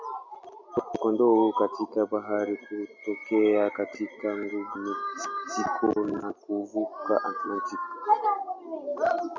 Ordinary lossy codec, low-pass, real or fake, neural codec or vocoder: MP3, 64 kbps; 7.2 kHz; real; none